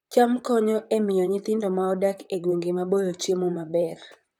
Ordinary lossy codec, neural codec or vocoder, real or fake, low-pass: none; vocoder, 44.1 kHz, 128 mel bands, Pupu-Vocoder; fake; 19.8 kHz